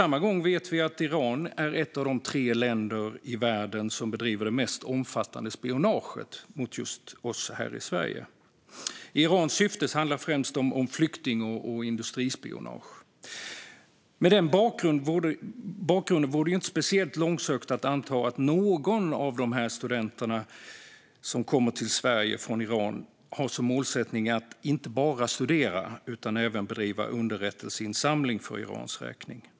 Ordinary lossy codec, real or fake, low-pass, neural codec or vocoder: none; real; none; none